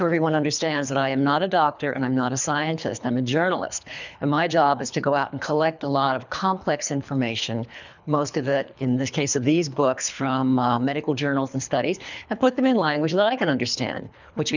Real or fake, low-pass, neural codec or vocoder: fake; 7.2 kHz; codec, 24 kHz, 3 kbps, HILCodec